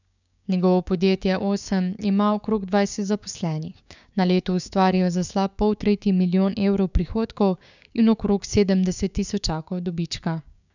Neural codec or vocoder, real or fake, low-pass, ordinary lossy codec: codec, 16 kHz, 6 kbps, DAC; fake; 7.2 kHz; none